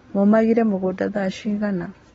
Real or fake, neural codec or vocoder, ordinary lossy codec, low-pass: real; none; AAC, 24 kbps; 19.8 kHz